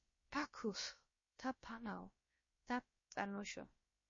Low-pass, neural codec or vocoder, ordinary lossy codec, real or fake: 7.2 kHz; codec, 16 kHz, about 1 kbps, DyCAST, with the encoder's durations; MP3, 32 kbps; fake